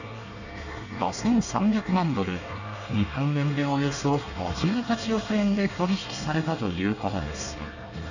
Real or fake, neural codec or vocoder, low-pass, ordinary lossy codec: fake; codec, 24 kHz, 1 kbps, SNAC; 7.2 kHz; none